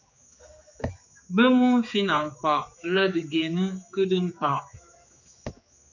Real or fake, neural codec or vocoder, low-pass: fake; codec, 16 kHz, 4 kbps, X-Codec, HuBERT features, trained on general audio; 7.2 kHz